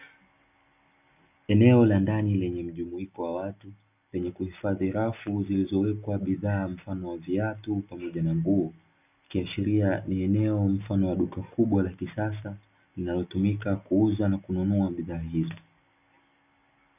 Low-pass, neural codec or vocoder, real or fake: 3.6 kHz; none; real